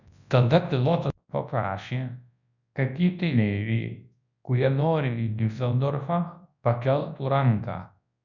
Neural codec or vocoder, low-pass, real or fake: codec, 24 kHz, 0.9 kbps, WavTokenizer, large speech release; 7.2 kHz; fake